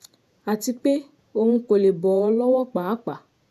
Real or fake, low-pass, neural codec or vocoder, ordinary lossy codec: fake; 14.4 kHz; vocoder, 48 kHz, 128 mel bands, Vocos; none